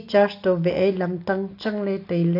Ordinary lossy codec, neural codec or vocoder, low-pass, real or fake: AAC, 32 kbps; none; 5.4 kHz; real